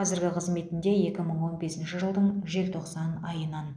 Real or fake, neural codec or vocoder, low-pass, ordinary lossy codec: real; none; 9.9 kHz; none